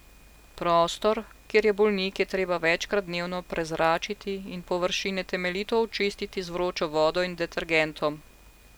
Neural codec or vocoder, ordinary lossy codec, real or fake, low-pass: none; none; real; none